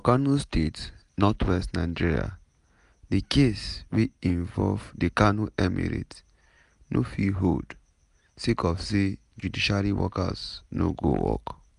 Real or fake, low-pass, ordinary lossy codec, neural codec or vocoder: real; 10.8 kHz; none; none